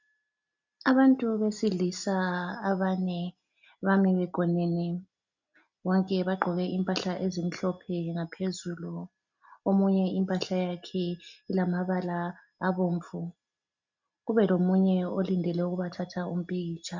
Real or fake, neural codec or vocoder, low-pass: real; none; 7.2 kHz